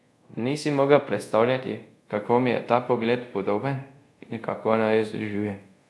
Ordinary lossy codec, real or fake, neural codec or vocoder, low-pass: none; fake; codec, 24 kHz, 0.5 kbps, DualCodec; none